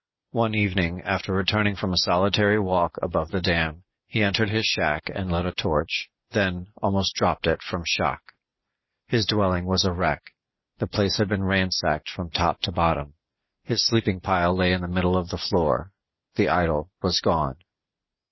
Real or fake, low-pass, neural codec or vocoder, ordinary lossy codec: real; 7.2 kHz; none; MP3, 24 kbps